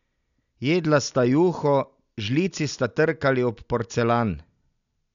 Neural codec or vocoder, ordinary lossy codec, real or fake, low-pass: none; none; real; 7.2 kHz